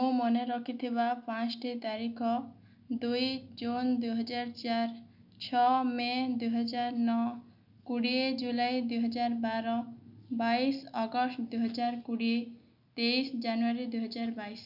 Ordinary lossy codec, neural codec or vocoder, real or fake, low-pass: none; none; real; 5.4 kHz